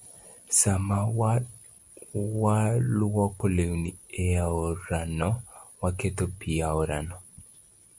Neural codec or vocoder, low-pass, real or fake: none; 10.8 kHz; real